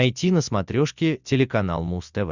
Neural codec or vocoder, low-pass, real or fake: none; 7.2 kHz; real